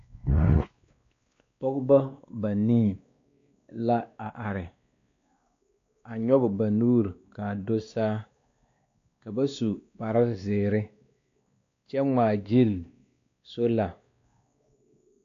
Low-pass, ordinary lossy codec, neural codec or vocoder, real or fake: 7.2 kHz; AAC, 48 kbps; codec, 16 kHz, 2 kbps, X-Codec, WavLM features, trained on Multilingual LibriSpeech; fake